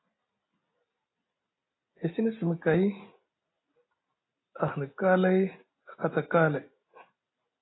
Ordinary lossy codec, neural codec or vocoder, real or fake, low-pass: AAC, 16 kbps; none; real; 7.2 kHz